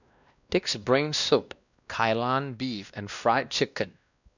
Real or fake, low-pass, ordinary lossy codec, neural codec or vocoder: fake; 7.2 kHz; none; codec, 16 kHz, 1 kbps, X-Codec, WavLM features, trained on Multilingual LibriSpeech